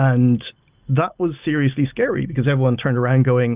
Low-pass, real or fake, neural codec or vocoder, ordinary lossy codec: 3.6 kHz; real; none; Opus, 24 kbps